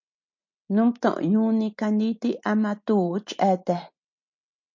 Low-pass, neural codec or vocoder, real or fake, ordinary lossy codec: 7.2 kHz; none; real; MP3, 48 kbps